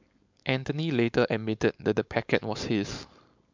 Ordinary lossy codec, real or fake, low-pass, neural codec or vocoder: MP3, 64 kbps; fake; 7.2 kHz; codec, 16 kHz, 4.8 kbps, FACodec